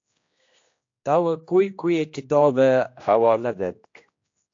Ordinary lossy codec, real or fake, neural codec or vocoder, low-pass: AAC, 48 kbps; fake; codec, 16 kHz, 1 kbps, X-Codec, HuBERT features, trained on general audio; 7.2 kHz